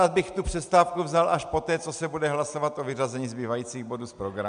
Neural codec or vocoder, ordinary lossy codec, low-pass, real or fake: none; MP3, 96 kbps; 9.9 kHz; real